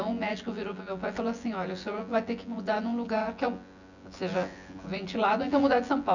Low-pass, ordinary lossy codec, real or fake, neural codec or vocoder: 7.2 kHz; none; fake; vocoder, 24 kHz, 100 mel bands, Vocos